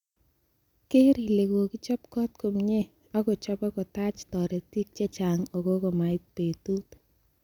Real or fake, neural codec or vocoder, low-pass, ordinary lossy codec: real; none; 19.8 kHz; none